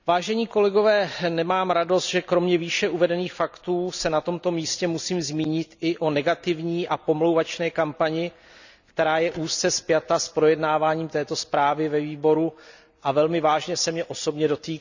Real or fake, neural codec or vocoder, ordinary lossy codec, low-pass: real; none; none; 7.2 kHz